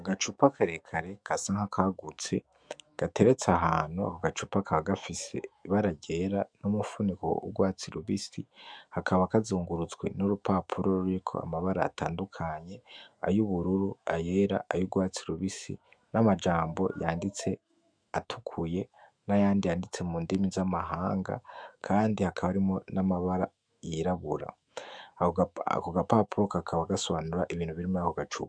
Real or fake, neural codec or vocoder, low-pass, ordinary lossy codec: fake; autoencoder, 48 kHz, 128 numbers a frame, DAC-VAE, trained on Japanese speech; 9.9 kHz; Opus, 64 kbps